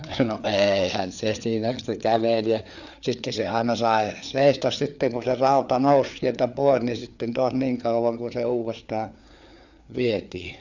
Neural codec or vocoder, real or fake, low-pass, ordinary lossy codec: codec, 16 kHz, 4 kbps, FreqCodec, larger model; fake; 7.2 kHz; none